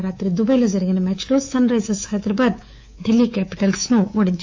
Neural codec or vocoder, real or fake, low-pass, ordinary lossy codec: codec, 24 kHz, 3.1 kbps, DualCodec; fake; 7.2 kHz; AAC, 48 kbps